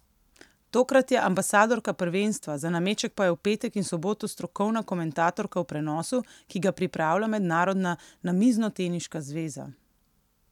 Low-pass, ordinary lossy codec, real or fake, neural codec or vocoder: 19.8 kHz; none; real; none